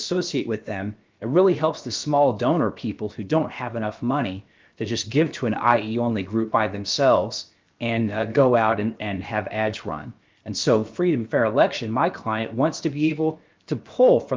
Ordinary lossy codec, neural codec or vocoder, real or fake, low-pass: Opus, 32 kbps; codec, 16 kHz, about 1 kbps, DyCAST, with the encoder's durations; fake; 7.2 kHz